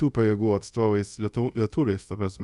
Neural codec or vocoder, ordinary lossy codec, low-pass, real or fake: codec, 24 kHz, 0.5 kbps, DualCodec; Opus, 64 kbps; 10.8 kHz; fake